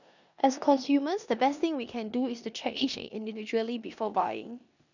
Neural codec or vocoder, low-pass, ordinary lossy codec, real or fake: codec, 16 kHz in and 24 kHz out, 0.9 kbps, LongCat-Audio-Codec, four codebook decoder; 7.2 kHz; none; fake